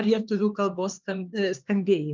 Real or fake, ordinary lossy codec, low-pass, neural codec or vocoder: fake; Opus, 24 kbps; 7.2 kHz; codec, 16 kHz, 2 kbps, X-Codec, HuBERT features, trained on LibriSpeech